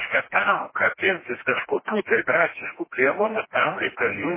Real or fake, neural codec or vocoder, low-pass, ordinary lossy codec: fake; codec, 16 kHz, 1 kbps, FreqCodec, smaller model; 3.6 kHz; MP3, 16 kbps